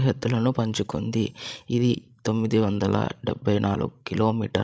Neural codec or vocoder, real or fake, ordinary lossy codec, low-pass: codec, 16 kHz, 16 kbps, FreqCodec, larger model; fake; none; none